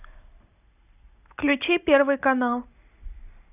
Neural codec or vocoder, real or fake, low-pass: none; real; 3.6 kHz